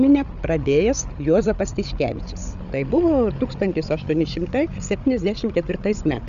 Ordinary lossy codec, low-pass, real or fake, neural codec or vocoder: AAC, 96 kbps; 7.2 kHz; fake; codec, 16 kHz, 8 kbps, FreqCodec, larger model